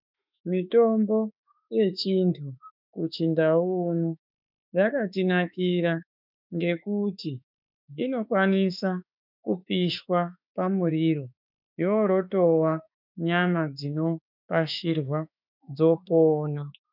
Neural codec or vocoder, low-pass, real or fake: autoencoder, 48 kHz, 32 numbers a frame, DAC-VAE, trained on Japanese speech; 5.4 kHz; fake